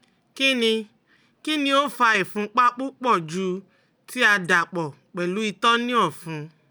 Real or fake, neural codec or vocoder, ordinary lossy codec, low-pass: real; none; none; none